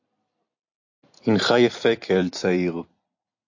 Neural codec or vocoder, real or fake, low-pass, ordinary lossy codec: vocoder, 44.1 kHz, 128 mel bands every 256 samples, BigVGAN v2; fake; 7.2 kHz; AAC, 48 kbps